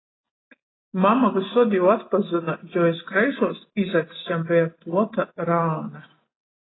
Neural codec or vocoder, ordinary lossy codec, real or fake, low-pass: none; AAC, 16 kbps; real; 7.2 kHz